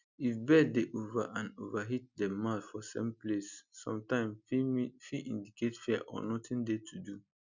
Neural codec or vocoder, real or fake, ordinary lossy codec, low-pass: none; real; none; 7.2 kHz